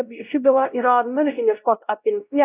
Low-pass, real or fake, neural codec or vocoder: 3.6 kHz; fake; codec, 16 kHz, 0.5 kbps, X-Codec, WavLM features, trained on Multilingual LibriSpeech